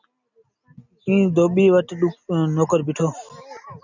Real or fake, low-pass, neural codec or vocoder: real; 7.2 kHz; none